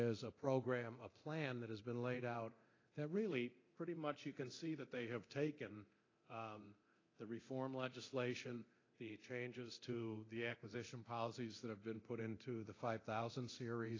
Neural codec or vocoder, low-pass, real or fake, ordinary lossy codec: codec, 24 kHz, 0.9 kbps, DualCodec; 7.2 kHz; fake; AAC, 32 kbps